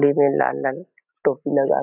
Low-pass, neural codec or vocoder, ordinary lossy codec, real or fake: 3.6 kHz; none; none; real